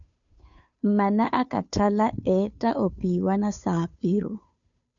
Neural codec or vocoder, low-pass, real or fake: codec, 16 kHz, 2 kbps, FunCodec, trained on Chinese and English, 25 frames a second; 7.2 kHz; fake